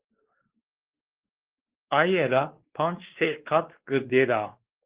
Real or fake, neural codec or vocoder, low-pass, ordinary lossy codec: fake; codec, 16 kHz, 2 kbps, X-Codec, WavLM features, trained on Multilingual LibriSpeech; 3.6 kHz; Opus, 16 kbps